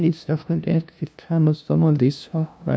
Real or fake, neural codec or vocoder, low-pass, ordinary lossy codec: fake; codec, 16 kHz, 0.5 kbps, FunCodec, trained on LibriTTS, 25 frames a second; none; none